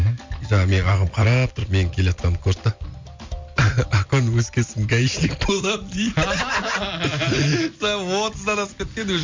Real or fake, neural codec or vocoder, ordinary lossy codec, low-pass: real; none; MP3, 48 kbps; 7.2 kHz